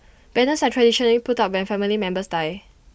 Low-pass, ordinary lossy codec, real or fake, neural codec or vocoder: none; none; real; none